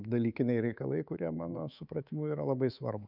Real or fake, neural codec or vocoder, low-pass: fake; codec, 24 kHz, 3.1 kbps, DualCodec; 5.4 kHz